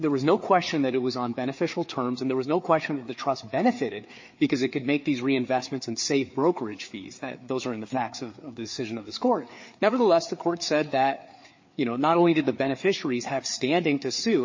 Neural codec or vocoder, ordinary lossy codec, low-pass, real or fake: codec, 16 kHz, 4 kbps, FreqCodec, larger model; MP3, 32 kbps; 7.2 kHz; fake